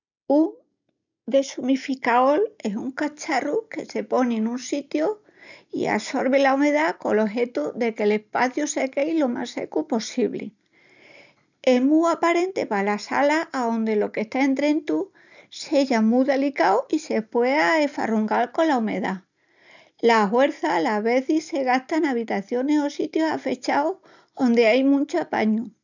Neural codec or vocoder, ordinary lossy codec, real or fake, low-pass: none; none; real; 7.2 kHz